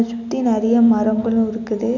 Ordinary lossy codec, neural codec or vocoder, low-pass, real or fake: none; none; 7.2 kHz; real